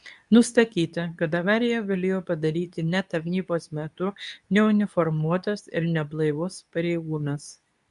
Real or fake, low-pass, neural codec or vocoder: fake; 10.8 kHz; codec, 24 kHz, 0.9 kbps, WavTokenizer, medium speech release version 2